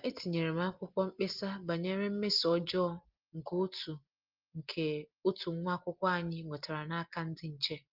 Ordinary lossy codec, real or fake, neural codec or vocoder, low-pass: Opus, 32 kbps; real; none; 5.4 kHz